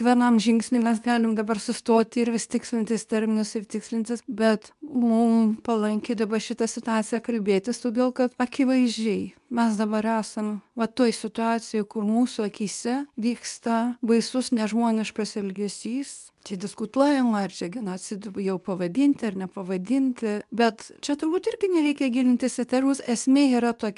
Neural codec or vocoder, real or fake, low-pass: codec, 24 kHz, 0.9 kbps, WavTokenizer, medium speech release version 2; fake; 10.8 kHz